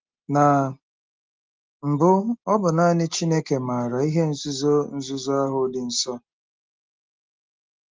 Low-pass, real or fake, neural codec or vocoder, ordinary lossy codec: 7.2 kHz; real; none; Opus, 32 kbps